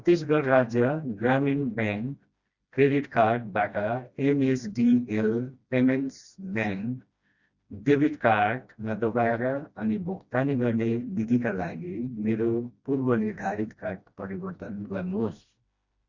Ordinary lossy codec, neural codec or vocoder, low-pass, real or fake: Opus, 64 kbps; codec, 16 kHz, 1 kbps, FreqCodec, smaller model; 7.2 kHz; fake